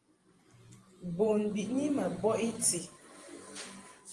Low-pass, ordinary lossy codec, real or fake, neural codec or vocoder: 10.8 kHz; Opus, 32 kbps; fake; vocoder, 44.1 kHz, 128 mel bands every 512 samples, BigVGAN v2